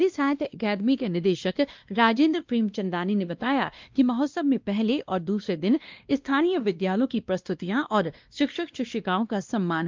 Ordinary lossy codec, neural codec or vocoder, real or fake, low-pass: Opus, 24 kbps; codec, 16 kHz, 1 kbps, X-Codec, WavLM features, trained on Multilingual LibriSpeech; fake; 7.2 kHz